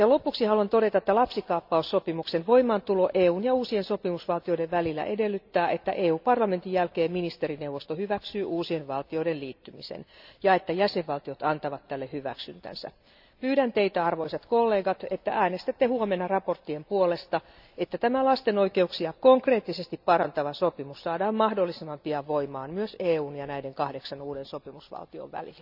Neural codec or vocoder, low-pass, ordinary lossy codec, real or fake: none; 5.4 kHz; none; real